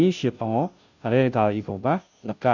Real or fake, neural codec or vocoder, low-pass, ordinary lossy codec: fake; codec, 16 kHz, 0.5 kbps, FunCodec, trained on Chinese and English, 25 frames a second; 7.2 kHz; none